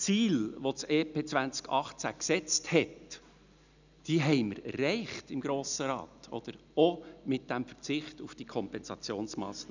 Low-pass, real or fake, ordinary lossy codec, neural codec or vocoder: 7.2 kHz; real; none; none